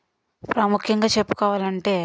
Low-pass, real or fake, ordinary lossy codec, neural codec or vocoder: none; real; none; none